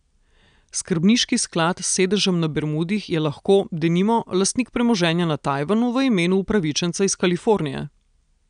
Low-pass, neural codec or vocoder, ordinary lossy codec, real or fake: 9.9 kHz; none; none; real